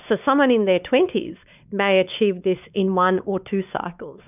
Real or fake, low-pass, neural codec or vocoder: fake; 3.6 kHz; codec, 16 kHz, 4 kbps, X-Codec, WavLM features, trained on Multilingual LibriSpeech